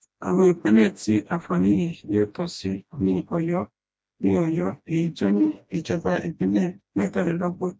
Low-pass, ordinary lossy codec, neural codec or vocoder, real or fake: none; none; codec, 16 kHz, 1 kbps, FreqCodec, smaller model; fake